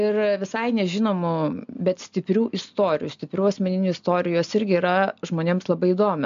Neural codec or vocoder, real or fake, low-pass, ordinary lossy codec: none; real; 7.2 kHz; MP3, 64 kbps